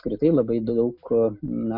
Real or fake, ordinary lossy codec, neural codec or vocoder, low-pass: real; MP3, 48 kbps; none; 5.4 kHz